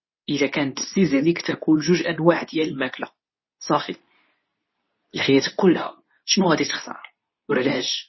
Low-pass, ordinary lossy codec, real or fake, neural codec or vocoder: 7.2 kHz; MP3, 24 kbps; fake; codec, 24 kHz, 0.9 kbps, WavTokenizer, medium speech release version 2